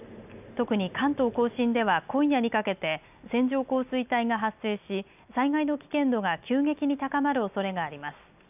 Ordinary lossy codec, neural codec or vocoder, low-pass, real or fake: none; none; 3.6 kHz; real